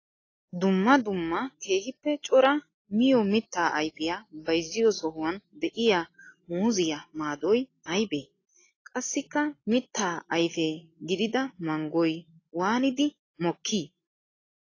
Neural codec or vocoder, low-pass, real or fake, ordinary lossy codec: none; 7.2 kHz; real; AAC, 32 kbps